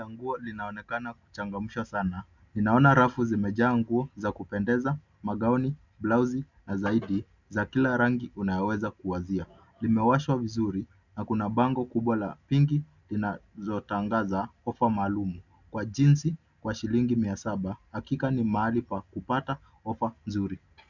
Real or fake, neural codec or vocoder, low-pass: real; none; 7.2 kHz